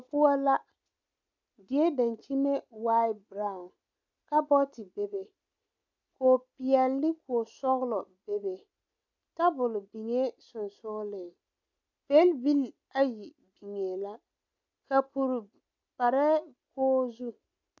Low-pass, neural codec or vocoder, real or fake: 7.2 kHz; none; real